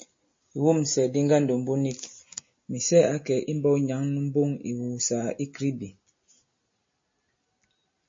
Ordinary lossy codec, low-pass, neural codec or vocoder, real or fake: MP3, 32 kbps; 7.2 kHz; none; real